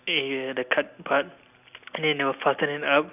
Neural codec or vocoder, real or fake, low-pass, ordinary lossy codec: none; real; 3.6 kHz; none